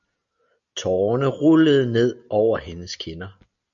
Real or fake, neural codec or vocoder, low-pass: real; none; 7.2 kHz